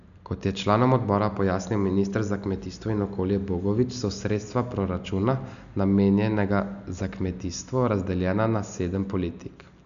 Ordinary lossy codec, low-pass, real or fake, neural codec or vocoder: none; 7.2 kHz; real; none